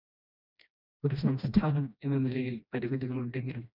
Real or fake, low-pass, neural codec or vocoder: fake; 5.4 kHz; codec, 16 kHz, 1 kbps, FreqCodec, smaller model